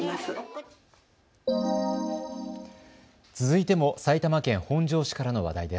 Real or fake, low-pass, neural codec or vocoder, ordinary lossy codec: real; none; none; none